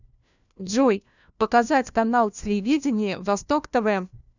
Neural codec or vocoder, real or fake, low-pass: codec, 16 kHz, 1 kbps, FunCodec, trained on LibriTTS, 50 frames a second; fake; 7.2 kHz